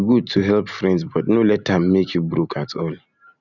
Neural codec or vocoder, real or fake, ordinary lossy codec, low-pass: none; real; none; 7.2 kHz